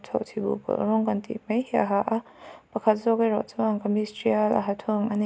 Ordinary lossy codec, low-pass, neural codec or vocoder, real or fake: none; none; none; real